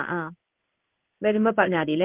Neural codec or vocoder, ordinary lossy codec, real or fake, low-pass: codec, 16 kHz in and 24 kHz out, 1 kbps, XY-Tokenizer; Opus, 24 kbps; fake; 3.6 kHz